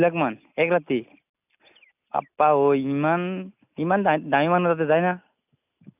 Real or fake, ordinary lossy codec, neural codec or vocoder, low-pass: real; none; none; 3.6 kHz